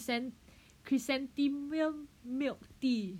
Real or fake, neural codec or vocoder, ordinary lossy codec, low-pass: real; none; none; 19.8 kHz